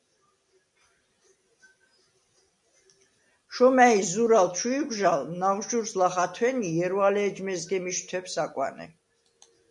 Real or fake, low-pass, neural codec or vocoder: real; 10.8 kHz; none